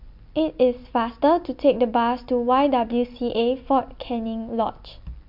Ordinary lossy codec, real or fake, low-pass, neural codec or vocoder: MP3, 48 kbps; real; 5.4 kHz; none